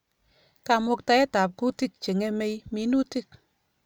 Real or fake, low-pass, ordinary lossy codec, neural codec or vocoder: real; none; none; none